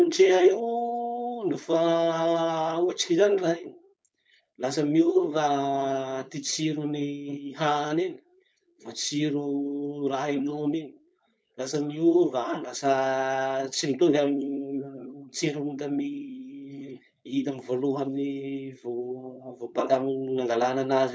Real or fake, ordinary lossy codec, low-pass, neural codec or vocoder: fake; none; none; codec, 16 kHz, 4.8 kbps, FACodec